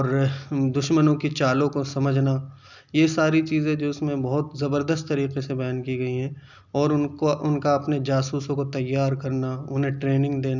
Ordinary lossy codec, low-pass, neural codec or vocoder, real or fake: none; 7.2 kHz; none; real